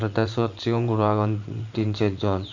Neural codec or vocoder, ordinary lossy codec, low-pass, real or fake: vocoder, 44.1 kHz, 80 mel bands, Vocos; Opus, 64 kbps; 7.2 kHz; fake